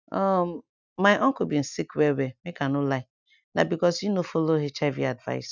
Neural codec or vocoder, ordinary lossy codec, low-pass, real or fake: none; none; 7.2 kHz; real